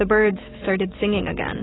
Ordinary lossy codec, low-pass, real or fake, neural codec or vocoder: AAC, 16 kbps; 7.2 kHz; fake; autoencoder, 48 kHz, 128 numbers a frame, DAC-VAE, trained on Japanese speech